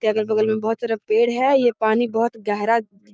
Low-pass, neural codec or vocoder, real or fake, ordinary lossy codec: none; codec, 16 kHz, 6 kbps, DAC; fake; none